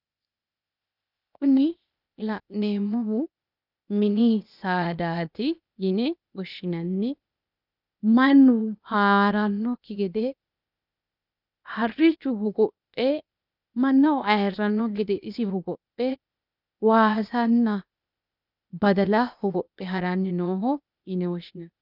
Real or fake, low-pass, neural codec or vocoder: fake; 5.4 kHz; codec, 16 kHz, 0.8 kbps, ZipCodec